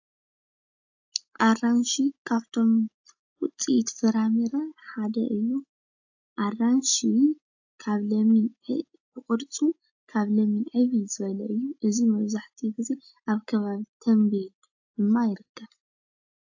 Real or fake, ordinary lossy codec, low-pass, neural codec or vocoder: real; AAC, 48 kbps; 7.2 kHz; none